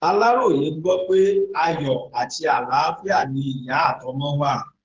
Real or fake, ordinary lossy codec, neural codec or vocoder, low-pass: fake; Opus, 16 kbps; codec, 16 kHz, 8 kbps, FreqCodec, smaller model; 7.2 kHz